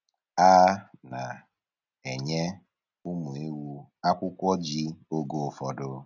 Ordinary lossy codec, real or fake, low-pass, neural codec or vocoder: none; real; 7.2 kHz; none